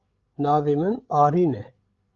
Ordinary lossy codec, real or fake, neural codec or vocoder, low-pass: Opus, 32 kbps; fake; codec, 16 kHz, 8 kbps, FreqCodec, larger model; 7.2 kHz